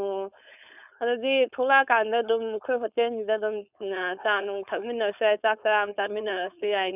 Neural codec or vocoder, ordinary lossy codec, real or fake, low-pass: codec, 16 kHz, 4.8 kbps, FACodec; none; fake; 3.6 kHz